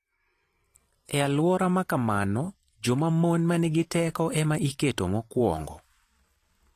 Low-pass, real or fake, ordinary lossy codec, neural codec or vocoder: 14.4 kHz; fake; AAC, 48 kbps; vocoder, 44.1 kHz, 128 mel bands every 512 samples, BigVGAN v2